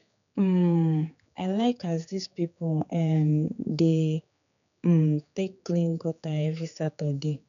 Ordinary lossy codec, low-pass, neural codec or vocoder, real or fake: none; 7.2 kHz; codec, 16 kHz, 4 kbps, X-Codec, HuBERT features, trained on general audio; fake